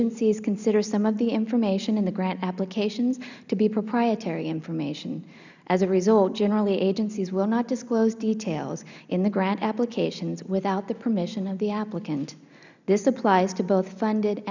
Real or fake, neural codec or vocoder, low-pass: real; none; 7.2 kHz